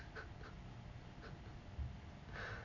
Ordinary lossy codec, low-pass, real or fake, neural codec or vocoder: none; 7.2 kHz; real; none